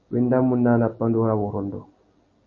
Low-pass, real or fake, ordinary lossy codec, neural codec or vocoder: 7.2 kHz; real; MP3, 32 kbps; none